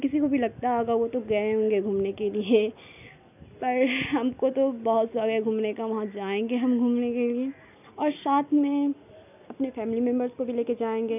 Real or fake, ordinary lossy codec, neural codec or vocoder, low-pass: real; none; none; 3.6 kHz